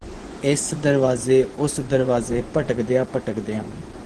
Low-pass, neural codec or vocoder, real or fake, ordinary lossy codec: 10.8 kHz; vocoder, 24 kHz, 100 mel bands, Vocos; fake; Opus, 16 kbps